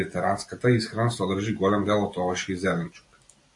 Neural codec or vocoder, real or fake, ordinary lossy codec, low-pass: none; real; AAC, 64 kbps; 10.8 kHz